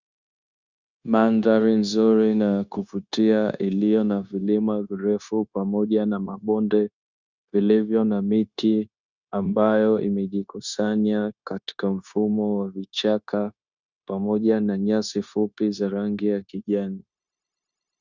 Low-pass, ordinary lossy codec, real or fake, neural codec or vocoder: 7.2 kHz; Opus, 64 kbps; fake; codec, 16 kHz, 0.9 kbps, LongCat-Audio-Codec